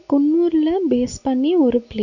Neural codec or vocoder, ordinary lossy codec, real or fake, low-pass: none; none; real; 7.2 kHz